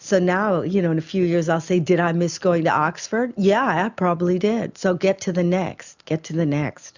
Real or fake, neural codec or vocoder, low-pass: real; none; 7.2 kHz